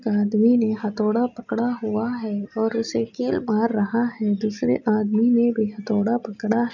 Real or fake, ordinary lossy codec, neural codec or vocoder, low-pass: real; none; none; 7.2 kHz